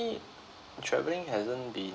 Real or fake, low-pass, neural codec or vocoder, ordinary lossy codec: real; none; none; none